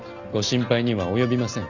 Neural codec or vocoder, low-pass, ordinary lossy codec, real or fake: none; 7.2 kHz; none; real